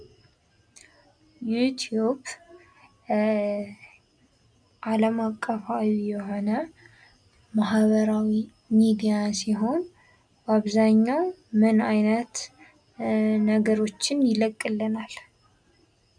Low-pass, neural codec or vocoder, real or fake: 9.9 kHz; none; real